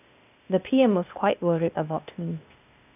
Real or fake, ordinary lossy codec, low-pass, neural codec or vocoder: fake; none; 3.6 kHz; codec, 16 kHz, 0.8 kbps, ZipCodec